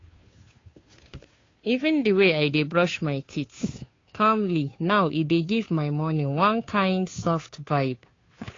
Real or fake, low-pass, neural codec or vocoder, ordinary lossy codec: fake; 7.2 kHz; codec, 16 kHz, 2 kbps, FunCodec, trained on Chinese and English, 25 frames a second; AAC, 32 kbps